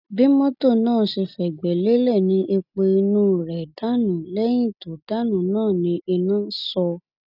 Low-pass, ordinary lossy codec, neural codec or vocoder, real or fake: 5.4 kHz; none; none; real